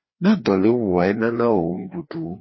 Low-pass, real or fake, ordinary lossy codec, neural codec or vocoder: 7.2 kHz; fake; MP3, 24 kbps; codec, 16 kHz, 2 kbps, FreqCodec, larger model